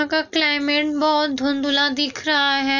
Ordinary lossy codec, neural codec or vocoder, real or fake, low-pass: none; none; real; 7.2 kHz